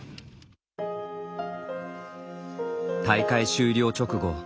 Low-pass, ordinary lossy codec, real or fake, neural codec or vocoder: none; none; real; none